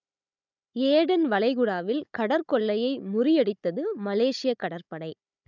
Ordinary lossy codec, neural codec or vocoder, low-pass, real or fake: none; codec, 16 kHz, 16 kbps, FunCodec, trained on Chinese and English, 50 frames a second; 7.2 kHz; fake